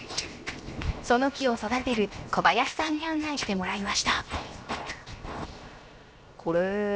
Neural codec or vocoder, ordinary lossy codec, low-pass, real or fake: codec, 16 kHz, 0.7 kbps, FocalCodec; none; none; fake